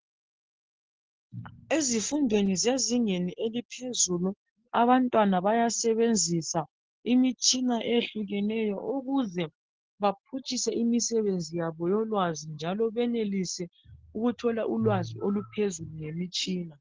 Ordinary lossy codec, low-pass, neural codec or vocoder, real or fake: Opus, 16 kbps; 7.2 kHz; none; real